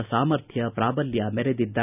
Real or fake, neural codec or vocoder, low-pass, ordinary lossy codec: real; none; 3.6 kHz; none